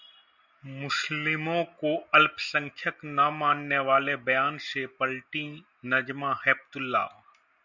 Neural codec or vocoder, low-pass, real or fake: none; 7.2 kHz; real